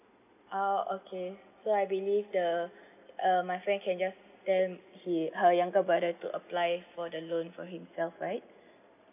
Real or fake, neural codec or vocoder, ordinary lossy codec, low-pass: real; none; none; 3.6 kHz